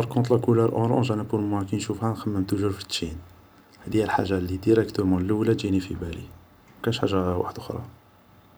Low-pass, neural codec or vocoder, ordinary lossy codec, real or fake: none; vocoder, 44.1 kHz, 128 mel bands every 512 samples, BigVGAN v2; none; fake